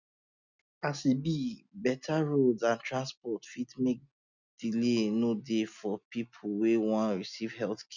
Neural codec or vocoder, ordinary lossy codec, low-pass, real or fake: none; none; 7.2 kHz; real